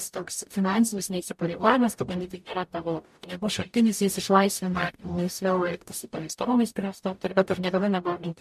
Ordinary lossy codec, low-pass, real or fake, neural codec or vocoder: MP3, 64 kbps; 14.4 kHz; fake; codec, 44.1 kHz, 0.9 kbps, DAC